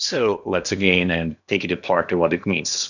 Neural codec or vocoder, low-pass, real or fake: codec, 16 kHz in and 24 kHz out, 0.8 kbps, FocalCodec, streaming, 65536 codes; 7.2 kHz; fake